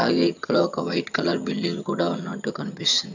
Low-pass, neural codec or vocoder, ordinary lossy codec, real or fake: 7.2 kHz; vocoder, 22.05 kHz, 80 mel bands, HiFi-GAN; none; fake